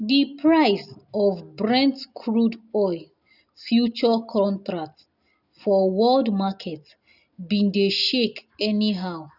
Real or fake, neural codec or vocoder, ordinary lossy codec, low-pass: real; none; none; 5.4 kHz